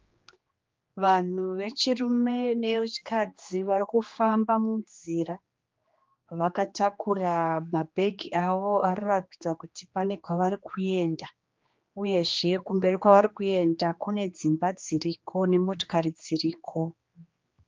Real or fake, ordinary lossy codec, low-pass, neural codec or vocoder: fake; Opus, 32 kbps; 7.2 kHz; codec, 16 kHz, 2 kbps, X-Codec, HuBERT features, trained on general audio